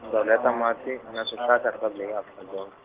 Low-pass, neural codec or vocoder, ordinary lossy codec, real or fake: 3.6 kHz; none; Opus, 16 kbps; real